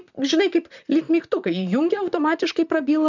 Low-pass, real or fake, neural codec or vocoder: 7.2 kHz; fake; vocoder, 44.1 kHz, 128 mel bands, Pupu-Vocoder